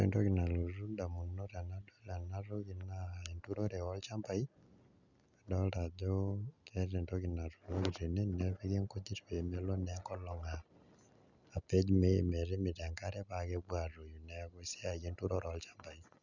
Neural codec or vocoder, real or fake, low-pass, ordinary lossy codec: none; real; 7.2 kHz; none